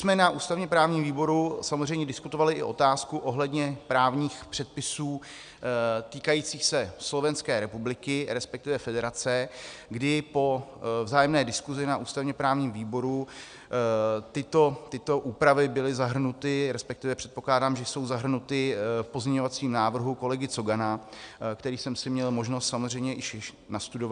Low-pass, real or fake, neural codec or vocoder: 9.9 kHz; real; none